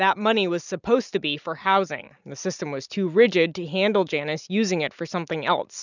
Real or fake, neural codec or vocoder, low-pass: real; none; 7.2 kHz